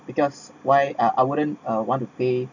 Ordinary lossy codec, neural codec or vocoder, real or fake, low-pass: none; none; real; 7.2 kHz